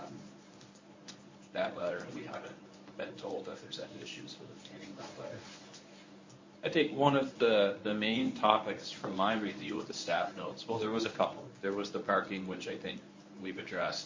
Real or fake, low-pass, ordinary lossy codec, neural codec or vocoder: fake; 7.2 kHz; MP3, 32 kbps; codec, 24 kHz, 0.9 kbps, WavTokenizer, medium speech release version 1